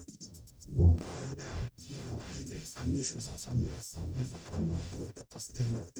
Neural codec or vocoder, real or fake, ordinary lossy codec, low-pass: codec, 44.1 kHz, 0.9 kbps, DAC; fake; none; none